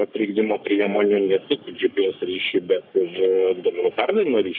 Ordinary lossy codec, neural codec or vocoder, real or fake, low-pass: AAC, 48 kbps; codec, 44.1 kHz, 3.4 kbps, Pupu-Codec; fake; 5.4 kHz